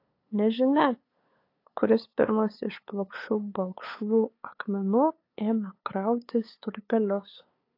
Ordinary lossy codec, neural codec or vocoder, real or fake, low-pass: AAC, 32 kbps; codec, 16 kHz, 8 kbps, FunCodec, trained on LibriTTS, 25 frames a second; fake; 5.4 kHz